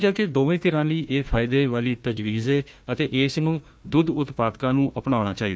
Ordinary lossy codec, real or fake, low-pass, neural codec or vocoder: none; fake; none; codec, 16 kHz, 1 kbps, FunCodec, trained on Chinese and English, 50 frames a second